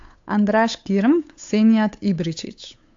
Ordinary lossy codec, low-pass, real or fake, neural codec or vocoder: AAC, 48 kbps; 7.2 kHz; fake; codec, 16 kHz, 8 kbps, FunCodec, trained on Chinese and English, 25 frames a second